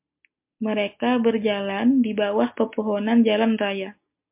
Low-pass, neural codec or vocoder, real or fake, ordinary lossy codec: 3.6 kHz; none; real; MP3, 32 kbps